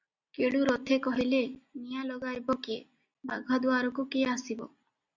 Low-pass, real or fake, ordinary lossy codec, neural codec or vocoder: 7.2 kHz; real; Opus, 64 kbps; none